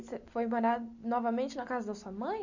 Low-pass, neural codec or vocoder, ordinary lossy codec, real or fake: 7.2 kHz; none; none; real